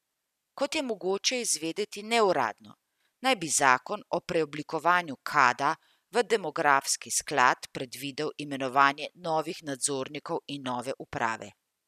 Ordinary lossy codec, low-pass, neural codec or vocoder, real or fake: none; 14.4 kHz; none; real